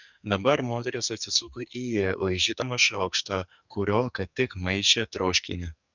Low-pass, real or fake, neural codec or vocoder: 7.2 kHz; fake; codec, 44.1 kHz, 2.6 kbps, SNAC